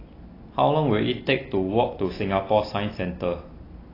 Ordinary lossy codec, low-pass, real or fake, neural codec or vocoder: AAC, 24 kbps; 5.4 kHz; real; none